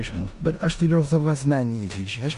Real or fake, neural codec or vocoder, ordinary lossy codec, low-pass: fake; codec, 16 kHz in and 24 kHz out, 0.9 kbps, LongCat-Audio-Codec, four codebook decoder; AAC, 64 kbps; 10.8 kHz